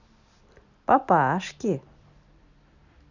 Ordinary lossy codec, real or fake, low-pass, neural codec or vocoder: none; real; 7.2 kHz; none